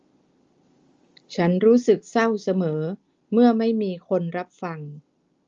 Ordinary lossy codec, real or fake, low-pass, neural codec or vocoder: Opus, 32 kbps; real; 7.2 kHz; none